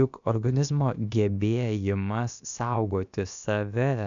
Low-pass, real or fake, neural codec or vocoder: 7.2 kHz; fake; codec, 16 kHz, about 1 kbps, DyCAST, with the encoder's durations